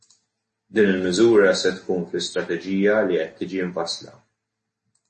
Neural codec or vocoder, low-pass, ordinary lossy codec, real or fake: none; 10.8 kHz; MP3, 32 kbps; real